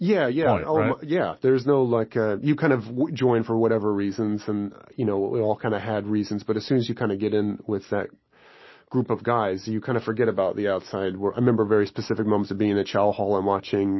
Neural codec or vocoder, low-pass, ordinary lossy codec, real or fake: none; 7.2 kHz; MP3, 24 kbps; real